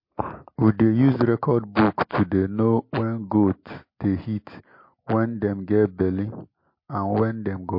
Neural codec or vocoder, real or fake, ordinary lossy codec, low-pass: none; real; MP3, 32 kbps; 5.4 kHz